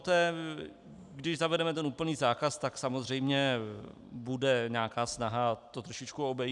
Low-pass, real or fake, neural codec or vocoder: 10.8 kHz; real; none